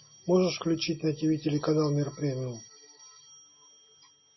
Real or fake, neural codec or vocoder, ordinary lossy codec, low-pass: real; none; MP3, 24 kbps; 7.2 kHz